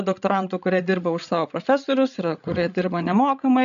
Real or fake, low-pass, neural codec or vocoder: fake; 7.2 kHz; codec, 16 kHz, 16 kbps, FreqCodec, larger model